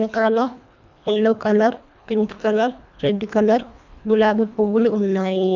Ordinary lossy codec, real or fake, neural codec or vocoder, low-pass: none; fake; codec, 24 kHz, 1.5 kbps, HILCodec; 7.2 kHz